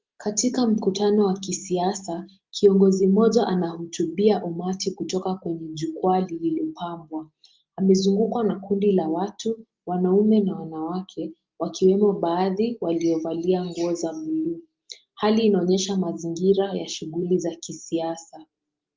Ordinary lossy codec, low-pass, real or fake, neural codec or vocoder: Opus, 32 kbps; 7.2 kHz; real; none